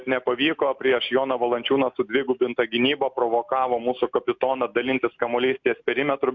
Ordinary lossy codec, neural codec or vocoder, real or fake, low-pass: MP3, 64 kbps; none; real; 7.2 kHz